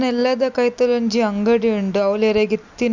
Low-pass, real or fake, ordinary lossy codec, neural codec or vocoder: 7.2 kHz; real; none; none